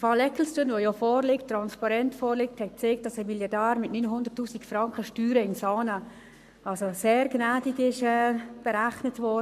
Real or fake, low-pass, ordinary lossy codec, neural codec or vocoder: fake; 14.4 kHz; none; codec, 44.1 kHz, 7.8 kbps, Pupu-Codec